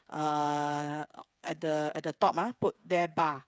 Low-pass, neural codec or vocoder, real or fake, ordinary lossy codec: none; codec, 16 kHz, 8 kbps, FreqCodec, smaller model; fake; none